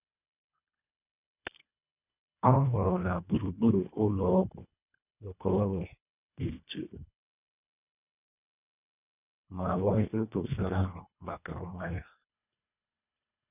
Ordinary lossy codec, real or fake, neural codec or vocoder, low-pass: none; fake; codec, 24 kHz, 1.5 kbps, HILCodec; 3.6 kHz